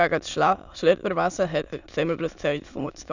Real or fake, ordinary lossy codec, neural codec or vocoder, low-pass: fake; none; autoencoder, 22.05 kHz, a latent of 192 numbers a frame, VITS, trained on many speakers; 7.2 kHz